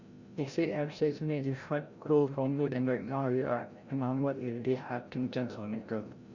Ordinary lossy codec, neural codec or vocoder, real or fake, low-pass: Opus, 64 kbps; codec, 16 kHz, 0.5 kbps, FreqCodec, larger model; fake; 7.2 kHz